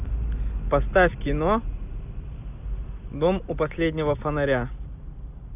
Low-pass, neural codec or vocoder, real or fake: 3.6 kHz; none; real